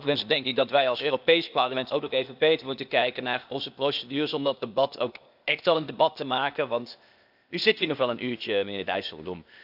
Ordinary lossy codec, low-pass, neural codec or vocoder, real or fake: none; 5.4 kHz; codec, 16 kHz, 0.8 kbps, ZipCodec; fake